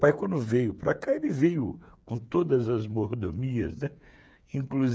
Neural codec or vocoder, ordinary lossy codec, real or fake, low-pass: codec, 16 kHz, 8 kbps, FreqCodec, smaller model; none; fake; none